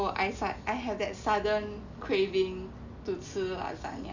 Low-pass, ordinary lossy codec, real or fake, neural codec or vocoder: 7.2 kHz; none; real; none